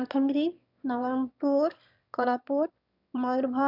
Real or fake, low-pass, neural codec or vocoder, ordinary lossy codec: fake; 5.4 kHz; autoencoder, 22.05 kHz, a latent of 192 numbers a frame, VITS, trained on one speaker; none